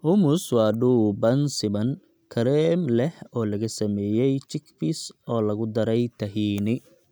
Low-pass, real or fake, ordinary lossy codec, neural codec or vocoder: none; real; none; none